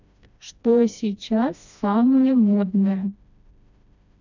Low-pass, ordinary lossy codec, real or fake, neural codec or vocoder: 7.2 kHz; none; fake; codec, 16 kHz, 1 kbps, FreqCodec, smaller model